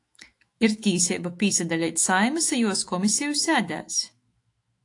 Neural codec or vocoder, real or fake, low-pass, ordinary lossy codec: autoencoder, 48 kHz, 128 numbers a frame, DAC-VAE, trained on Japanese speech; fake; 10.8 kHz; AAC, 48 kbps